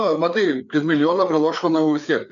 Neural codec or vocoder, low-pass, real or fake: codec, 16 kHz, 4 kbps, FreqCodec, larger model; 7.2 kHz; fake